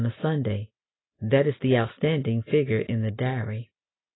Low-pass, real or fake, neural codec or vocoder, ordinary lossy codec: 7.2 kHz; real; none; AAC, 16 kbps